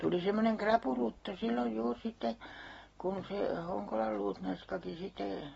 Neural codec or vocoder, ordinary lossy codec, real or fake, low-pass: none; AAC, 24 kbps; real; 19.8 kHz